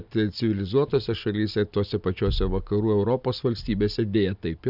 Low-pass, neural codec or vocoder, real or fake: 5.4 kHz; none; real